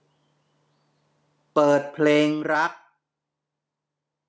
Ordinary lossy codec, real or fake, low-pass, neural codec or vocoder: none; real; none; none